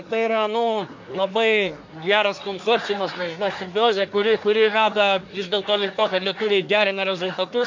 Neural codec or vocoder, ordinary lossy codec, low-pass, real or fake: codec, 24 kHz, 1 kbps, SNAC; MP3, 48 kbps; 7.2 kHz; fake